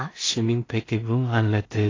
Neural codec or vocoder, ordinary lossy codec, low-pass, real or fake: codec, 16 kHz in and 24 kHz out, 0.4 kbps, LongCat-Audio-Codec, two codebook decoder; AAC, 32 kbps; 7.2 kHz; fake